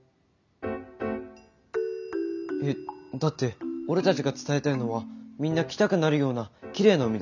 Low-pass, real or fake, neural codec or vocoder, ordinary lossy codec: 7.2 kHz; real; none; none